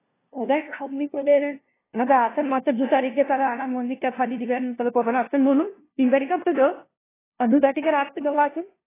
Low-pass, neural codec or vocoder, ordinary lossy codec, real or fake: 3.6 kHz; codec, 16 kHz, 0.5 kbps, FunCodec, trained on LibriTTS, 25 frames a second; AAC, 16 kbps; fake